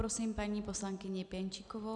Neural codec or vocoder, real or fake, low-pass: none; real; 10.8 kHz